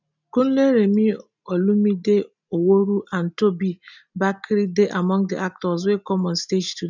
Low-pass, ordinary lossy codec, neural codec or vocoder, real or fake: 7.2 kHz; none; none; real